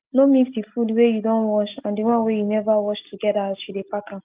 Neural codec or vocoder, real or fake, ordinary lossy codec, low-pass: none; real; Opus, 32 kbps; 3.6 kHz